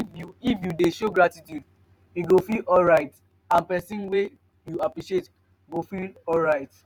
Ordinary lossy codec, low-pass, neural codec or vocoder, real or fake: none; 19.8 kHz; vocoder, 44.1 kHz, 128 mel bands every 512 samples, BigVGAN v2; fake